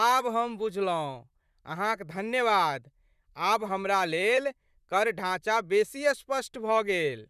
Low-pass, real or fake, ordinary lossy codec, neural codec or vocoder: 14.4 kHz; fake; none; vocoder, 44.1 kHz, 128 mel bands, Pupu-Vocoder